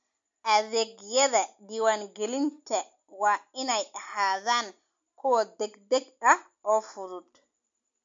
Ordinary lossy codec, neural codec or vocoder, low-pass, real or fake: MP3, 48 kbps; none; 7.2 kHz; real